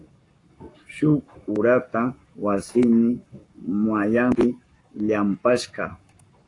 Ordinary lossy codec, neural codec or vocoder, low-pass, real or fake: AAC, 48 kbps; codec, 44.1 kHz, 7.8 kbps, Pupu-Codec; 10.8 kHz; fake